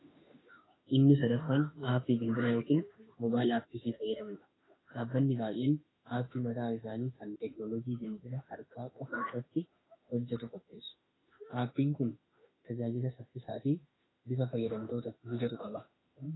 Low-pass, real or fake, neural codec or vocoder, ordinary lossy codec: 7.2 kHz; fake; autoencoder, 48 kHz, 32 numbers a frame, DAC-VAE, trained on Japanese speech; AAC, 16 kbps